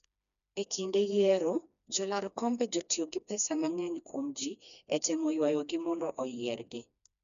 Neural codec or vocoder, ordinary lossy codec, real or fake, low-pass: codec, 16 kHz, 2 kbps, FreqCodec, smaller model; none; fake; 7.2 kHz